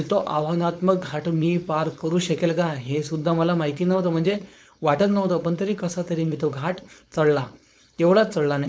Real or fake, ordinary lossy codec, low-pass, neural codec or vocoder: fake; none; none; codec, 16 kHz, 4.8 kbps, FACodec